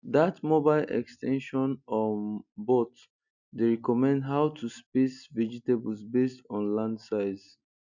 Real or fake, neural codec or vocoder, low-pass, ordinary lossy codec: real; none; 7.2 kHz; none